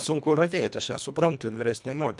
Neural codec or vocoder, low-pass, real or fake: codec, 24 kHz, 1.5 kbps, HILCodec; 10.8 kHz; fake